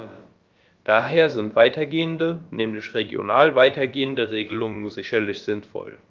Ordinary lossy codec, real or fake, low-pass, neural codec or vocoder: Opus, 32 kbps; fake; 7.2 kHz; codec, 16 kHz, about 1 kbps, DyCAST, with the encoder's durations